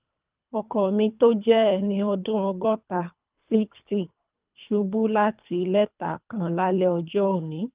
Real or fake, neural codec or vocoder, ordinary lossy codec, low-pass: fake; codec, 24 kHz, 3 kbps, HILCodec; Opus, 24 kbps; 3.6 kHz